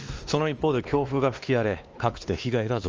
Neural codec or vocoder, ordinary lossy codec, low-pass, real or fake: codec, 16 kHz, 2 kbps, X-Codec, WavLM features, trained on Multilingual LibriSpeech; Opus, 24 kbps; 7.2 kHz; fake